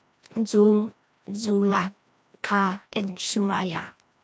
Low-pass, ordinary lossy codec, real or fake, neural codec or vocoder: none; none; fake; codec, 16 kHz, 1 kbps, FreqCodec, larger model